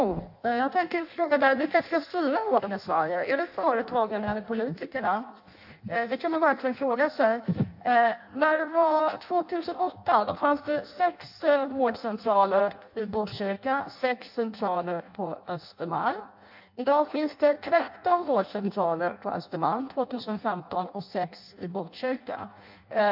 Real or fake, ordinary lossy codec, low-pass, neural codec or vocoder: fake; AAC, 48 kbps; 5.4 kHz; codec, 16 kHz in and 24 kHz out, 0.6 kbps, FireRedTTS-2 codec